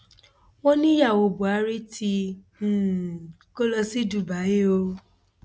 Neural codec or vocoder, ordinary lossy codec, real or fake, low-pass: none; none; real; none